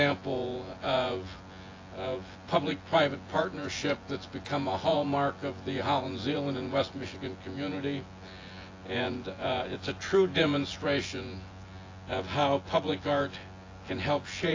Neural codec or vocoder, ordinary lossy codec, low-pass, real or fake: vocoder, 24 kHz, 100 mel bands, Vocos; AAC, 32 kbps; 7.2 kHz; fake